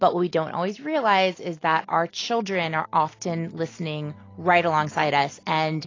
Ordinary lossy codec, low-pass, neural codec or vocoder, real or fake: AAC, 32 kbps; 7.2 kHz; none; real